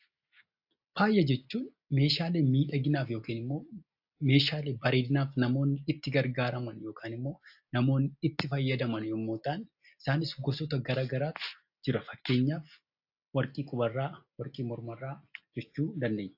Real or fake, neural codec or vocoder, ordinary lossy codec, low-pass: real; none; AAC, 48 kbps; 5.4 kHz